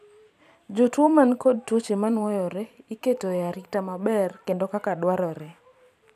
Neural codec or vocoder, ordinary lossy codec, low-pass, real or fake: vocoder, 44.1 kHz, 128 mel bands every 512 samples, BigVGAN v2; none; 14.4 kHz; fake